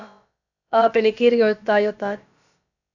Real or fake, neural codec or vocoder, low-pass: fake; codec, 16 kHz, about 1 kbps, DyCAST, with the encoder's durations; 7.2 kHz